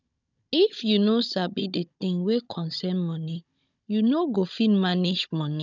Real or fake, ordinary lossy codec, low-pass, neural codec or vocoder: fake; none; 7.2 kHz; codec, 16 kHz, 16 kbps, FunCodec, trained on Chinese and English, 50 frames a second